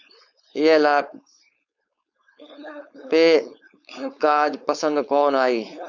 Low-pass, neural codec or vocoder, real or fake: 7.2 kHz; codec, 16 kHz, 4.8 kbps, FACodec; fake